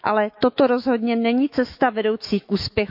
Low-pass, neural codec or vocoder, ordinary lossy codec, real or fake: 5.4 kHz; autoencoder, 48 kHz, 128 numbers a frame, DAC-VAE, trained on Japanese speech; none; fake